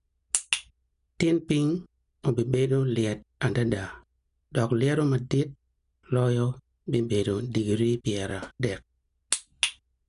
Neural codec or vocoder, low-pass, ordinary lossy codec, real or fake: none; 10.8 kHz; none; real